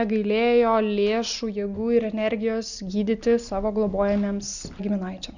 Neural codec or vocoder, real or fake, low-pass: none; real; 7.2 kHz